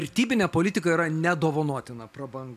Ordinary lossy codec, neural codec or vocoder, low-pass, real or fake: AAC, 96 kbps; none; 14.4 kHz; real